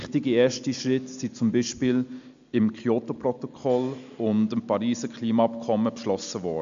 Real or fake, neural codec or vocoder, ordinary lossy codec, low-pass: real; none; MP3, 64 kbps; 7.2 kHz